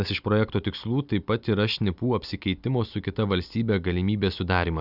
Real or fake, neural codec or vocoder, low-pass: real; none; 5.4 kHz